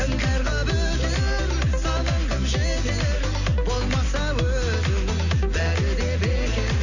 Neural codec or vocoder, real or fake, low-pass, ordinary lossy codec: none; real; 7.2 kHz; none